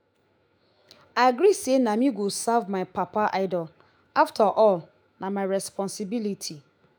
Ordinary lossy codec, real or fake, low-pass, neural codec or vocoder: none; fake; none; autoencoder, 48 kHz, 128 numbers a frame, DAC-VAE, trained on Japanese speech